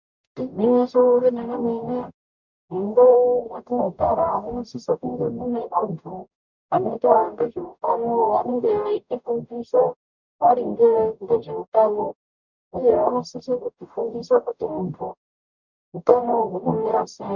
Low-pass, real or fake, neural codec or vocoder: 7.2 kHz; fake; codec, 44.1 kHz, 0.9 kbps, DAC